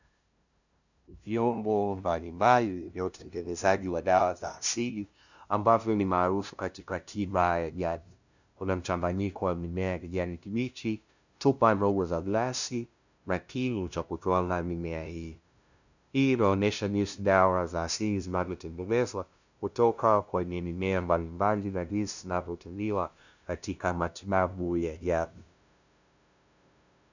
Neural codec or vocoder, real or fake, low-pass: codec, 16 kHz, 0.5 kbps, FunCodec, trained on LibriTTS, 25 frames a second; fake; 7.2 kHz